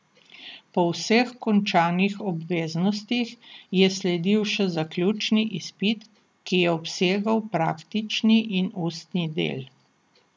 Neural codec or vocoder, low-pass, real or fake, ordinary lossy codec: none; none; real; none